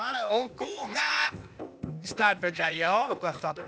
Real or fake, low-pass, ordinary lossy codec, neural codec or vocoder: fake; none; none; codec, 16 kHz, 0.8 kbps, ZipCodec